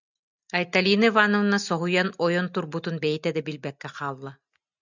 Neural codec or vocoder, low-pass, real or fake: none; 7.2 kHz; real